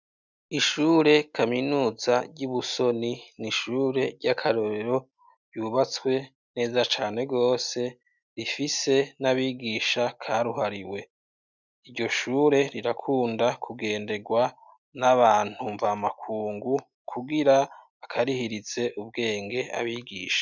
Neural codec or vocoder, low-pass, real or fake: none; 7.2 kHz; real